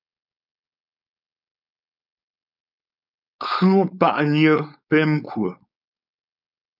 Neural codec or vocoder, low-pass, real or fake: codec, 16 kHz, 4.8 kbps, FACodec; 5.4 kHz; fake